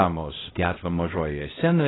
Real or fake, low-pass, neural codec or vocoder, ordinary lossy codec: fake; 7.2 kHz; codec, 16 kHz, 0.5 kbps, X-Codec, HuBERT features, trained on LibriSpeech; AAC, 16 kbps